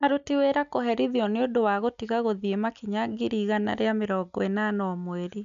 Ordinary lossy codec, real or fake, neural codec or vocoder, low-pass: none; real; none; 7.2 kHz